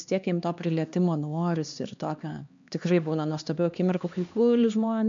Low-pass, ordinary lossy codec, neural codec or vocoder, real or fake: 7.2 kHz; MP3, 64 kbps; codec, 16 kHz, 2 kbps, X-Codec, HuBERT features, trained on LibriSpeech; fake